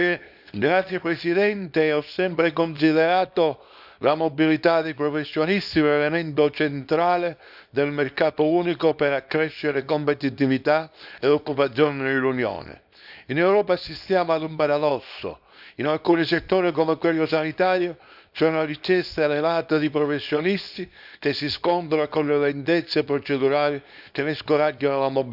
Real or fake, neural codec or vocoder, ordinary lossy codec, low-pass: fake; codec, 24 kHz, 0.9 kbps, WavTokenizer, small release; none; 5.4 kHz